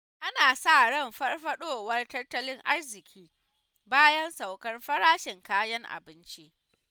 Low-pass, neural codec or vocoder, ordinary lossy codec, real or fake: none; none; none; real